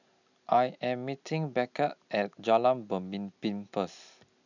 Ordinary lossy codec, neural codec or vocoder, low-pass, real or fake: none; none; 7.2 kHz; real